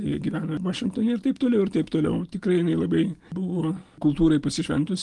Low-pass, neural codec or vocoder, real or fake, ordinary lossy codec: 10.8 kHz; none; real; Opus, 24 kbps